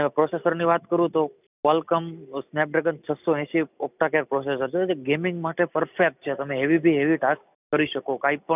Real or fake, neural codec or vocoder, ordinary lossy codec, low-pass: real; none; none; 3.6 kHz